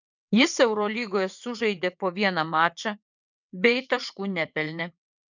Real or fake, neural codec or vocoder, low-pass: fake; vocoder, 22.05 kHz, 80 mel bands, WaveNeXt; 7.2 kHz